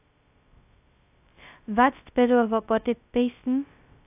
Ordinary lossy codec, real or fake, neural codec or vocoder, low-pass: AAC, 32 kbps; fake; codec, 16 kHz, 0.2 kbps, FocalCodec; 3.6 kHz